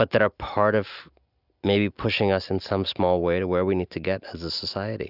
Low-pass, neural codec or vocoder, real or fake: 5.4 kHz; none; real